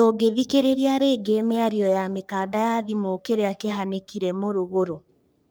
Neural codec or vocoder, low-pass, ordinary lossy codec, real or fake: codec, 44.1 kHz, 3.4 kbps, Pupu-Codec; none; none; fake